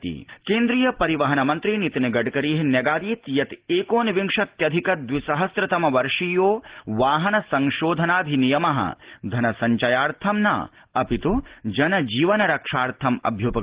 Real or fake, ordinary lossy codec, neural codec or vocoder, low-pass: real; Opus, 16 kbps; none; 3.6 kHz